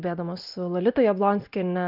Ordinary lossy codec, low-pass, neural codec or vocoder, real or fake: Opus, 24 kbps; 5.4 kHz; none; real